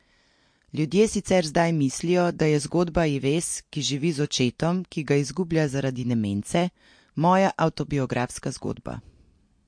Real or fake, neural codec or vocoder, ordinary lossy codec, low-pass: real; none; MP3, 48 kbps; 9.9 kHz